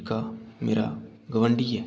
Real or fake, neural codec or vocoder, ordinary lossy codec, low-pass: real; none; none; none